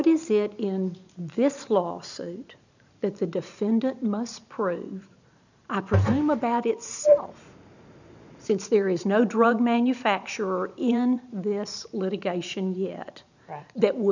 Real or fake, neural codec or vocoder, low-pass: fake; vocoder, 44.1 kHz, 128 mel bands every 512 samples, BigVGAN v2; 7.2 kHz